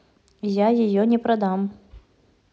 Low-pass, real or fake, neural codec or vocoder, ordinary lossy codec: none; real; none; none